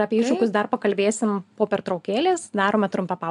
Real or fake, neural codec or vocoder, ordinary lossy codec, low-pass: real; none; AAC, 96 kbps; 10.8 kHz